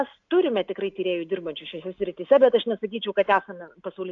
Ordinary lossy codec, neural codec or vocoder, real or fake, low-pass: AAC, 48 kbps; none; real; 7.2 kHz